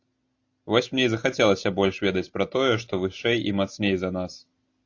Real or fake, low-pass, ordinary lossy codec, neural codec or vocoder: real; 7.2 kHz; AAC, 48 kbps; none